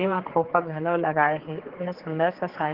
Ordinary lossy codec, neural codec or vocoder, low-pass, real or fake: Opus, 16 kbps; codec, 16 kHz, 4 kbps, X-Codec, HuBERT features, trained on general audio; 5.4 kHz; fake